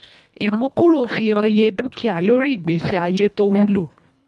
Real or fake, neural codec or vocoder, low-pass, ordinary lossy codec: fake; codec, 24 kHz, 1.5 kbps, HILCodec; none; none